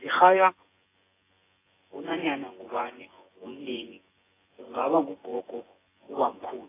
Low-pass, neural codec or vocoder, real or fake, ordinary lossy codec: 3.6 kHz; vocoder, 24 kHz, 100 mel bands, Vocos; fake; AAC, 16 kbps